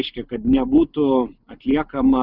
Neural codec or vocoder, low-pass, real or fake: none; 5.4 kHz; real